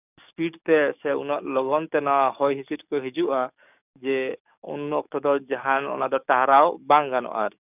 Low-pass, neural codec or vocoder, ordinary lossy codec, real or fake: 3.6 kHz; codec, 44.1 kHz, 7.8 kbps, DAC; none; fake